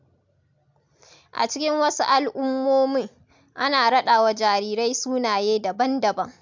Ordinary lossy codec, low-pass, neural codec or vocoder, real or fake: MP3, 64 kbps; 7.2 kHz; none; real